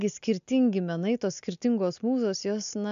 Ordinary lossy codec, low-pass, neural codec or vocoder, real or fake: MP3, 96 kbps; 7.2 kHz; none; real